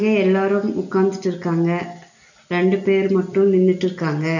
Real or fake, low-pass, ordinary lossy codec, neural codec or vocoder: real; 7.2 kHz; none; none